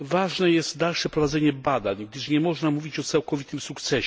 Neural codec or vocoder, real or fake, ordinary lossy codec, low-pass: none; real; none; none